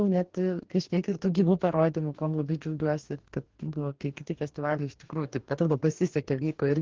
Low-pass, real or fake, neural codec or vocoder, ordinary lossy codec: 7.2 kHz; fake; codec, 44.1 kHz, 2.6 kbps, DAC; Opus, 16 kbps